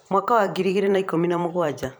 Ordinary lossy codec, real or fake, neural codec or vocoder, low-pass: none; fake; vocoder, 44.1 kHz, 128 mel bands every 512 samples, BigVGAN v2; none